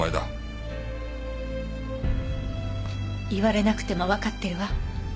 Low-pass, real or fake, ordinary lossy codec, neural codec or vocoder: none; real; none; none